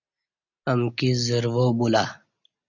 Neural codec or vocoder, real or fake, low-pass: none; real; 7.2 kHz